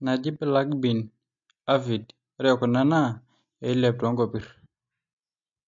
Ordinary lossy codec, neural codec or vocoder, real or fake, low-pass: MP3, 48 kbps; none; real; 7.2 kHz